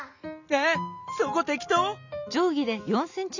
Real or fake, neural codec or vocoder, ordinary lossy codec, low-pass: real; none; none; 7.2 kHz